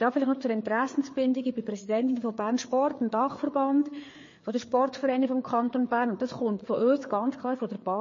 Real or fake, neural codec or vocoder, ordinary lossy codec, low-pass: fake; codec, 16 kHz, 4 kbps, FreqCodec, larger model; MP3, 32 kbps; 7.2 kHz